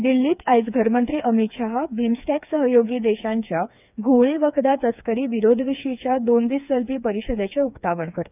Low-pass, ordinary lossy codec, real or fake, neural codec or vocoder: 3.6 kHz; none; fake; codec, 16 kHz, 4 kbps, FreqCodec, smaller model